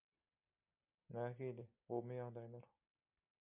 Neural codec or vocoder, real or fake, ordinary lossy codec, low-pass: none; real; MP3, 32 kbps; 3.6 kHz